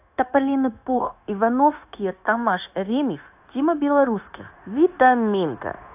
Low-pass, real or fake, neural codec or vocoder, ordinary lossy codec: 3.6 kHz; fake; codec, 16 kHz, 0.9 kbps, LongCat-Audio-Codec; AAC, 32 kbps